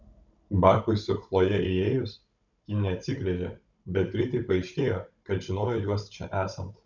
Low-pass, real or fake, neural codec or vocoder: 7.2 kHz; fake; codec, 16 kHz, 16 kbps, FunCodec, trained on Chinese and English, 50 frames a second